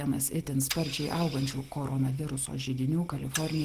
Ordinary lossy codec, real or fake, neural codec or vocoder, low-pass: Opus, 16 kbps; real; none; 14.4 kHz